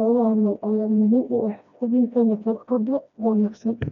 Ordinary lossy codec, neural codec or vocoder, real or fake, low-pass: none; codec, 16 kHz, 1 kbps, FreqCodec, smaller model; fake; 7.2 kHz